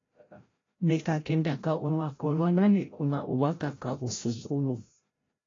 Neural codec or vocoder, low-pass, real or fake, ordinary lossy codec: codec, 16 kHz, 0.5 kbps, FreqCodec, larger model; 7.2 kHz; fake; AAC, 32 kbps